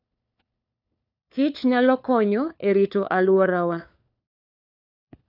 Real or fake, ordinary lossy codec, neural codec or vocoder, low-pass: fake; none; codec, 16 kHz, 4 kbps, FunCodec, trained on LibriTTS, 50 frames a second; 5.4 kHz